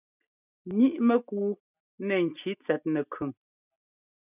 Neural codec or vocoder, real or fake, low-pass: none; real; 3.6 kHz